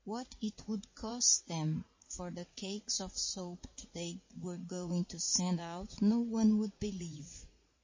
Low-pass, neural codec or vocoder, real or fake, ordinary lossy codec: 7.2 kHz; vocoder, 44.1 kHz, 80 mel bands, Vocos; fake; MP3, 32 kbps